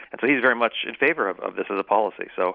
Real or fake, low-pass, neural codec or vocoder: real; 5.4 kHz; none